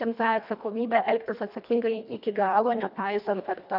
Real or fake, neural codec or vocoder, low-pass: fake; codec, 24 kHz, 1.5 kbps, HILCodec; 5.4 kHz